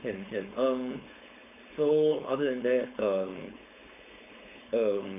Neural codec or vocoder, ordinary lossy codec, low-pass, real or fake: codec, 16 kHz, 4.8 kbps, FACodec; none; 3.6 kHz; fake